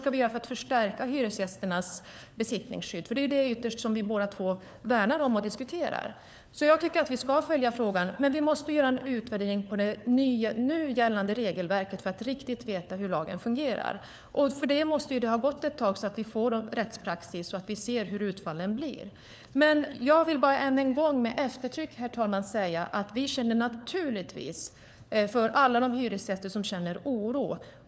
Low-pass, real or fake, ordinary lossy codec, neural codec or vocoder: none; fake; none; codec, 16 kHz, 4 kbps, FunCodec, trained on LibriTTS, 50 frames a second